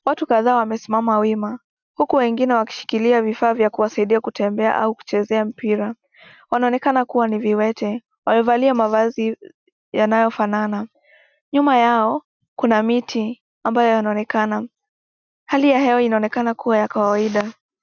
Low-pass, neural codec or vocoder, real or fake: 7.2 kHz; none; real